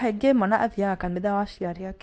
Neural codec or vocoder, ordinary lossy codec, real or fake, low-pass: codec, 24 kHz, 0.9 kbps, WavTokenizer, medium speech release version 2; none; fake; none